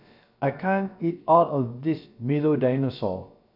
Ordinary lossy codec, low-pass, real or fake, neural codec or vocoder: none; 5.4 kHz; fake; codec, 16 kHz, about 1 kbps, DyCAST, with the encoder's durations